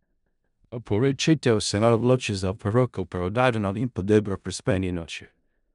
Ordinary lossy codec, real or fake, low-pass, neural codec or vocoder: none; fake; 10.8 kHz; codec, 16 kHz in and 24 kHz out, 0.4 kbps, LongCat-Audio-Codec, four codebook decoder